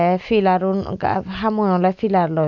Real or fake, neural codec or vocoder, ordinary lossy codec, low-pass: real; none; none; 7.2 kHz